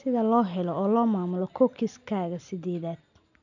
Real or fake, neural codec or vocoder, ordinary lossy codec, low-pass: real; none; none; 7.2 kHz